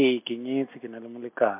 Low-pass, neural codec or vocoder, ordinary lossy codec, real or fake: 3.6 kHz; none; MP3, 32 kbps; real